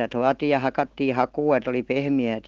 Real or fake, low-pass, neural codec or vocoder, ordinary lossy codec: real; 7.2 kHz; none; Opus, 16 kbps